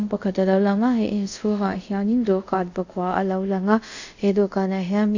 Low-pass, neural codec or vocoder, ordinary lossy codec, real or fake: 7.2 kHz; codec, 24 kHz, 0.5 kbps, DualCodec; none; fake